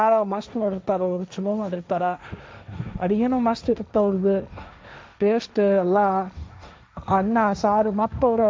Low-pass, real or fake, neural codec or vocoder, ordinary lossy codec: none; fake; codec, 16 kHz, 1.1 kbps, Voila-Tokenizer; none